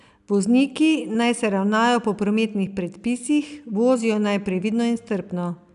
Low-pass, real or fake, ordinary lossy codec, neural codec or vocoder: 10.8 kHz; real; none; none